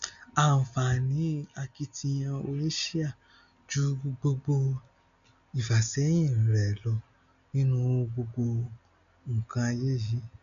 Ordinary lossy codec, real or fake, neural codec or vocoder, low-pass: none; real; none; 7.2 kHz